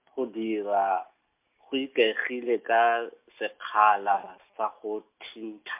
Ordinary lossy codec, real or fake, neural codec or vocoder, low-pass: MP3, 32 kbps; real; none; 3.6 kHz